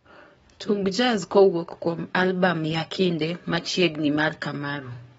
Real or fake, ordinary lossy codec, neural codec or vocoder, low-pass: fake; AAC, 24 kbps; codec, 44.1 kHz, 7.8 kbps, DAC; 19.8 kHz